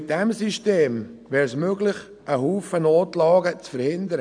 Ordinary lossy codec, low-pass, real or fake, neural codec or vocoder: none; 9.9 kHz; real; none